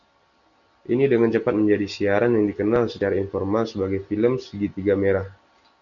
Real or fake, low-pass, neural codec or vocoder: real; 7.2 kHz; none